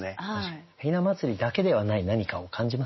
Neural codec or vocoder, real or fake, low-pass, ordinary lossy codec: none; real; 7.2 kHz; MP3, 24 kbps